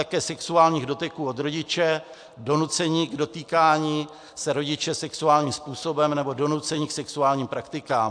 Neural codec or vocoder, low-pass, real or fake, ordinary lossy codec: none; 9.9 kHz; real; AAC, 64 kbps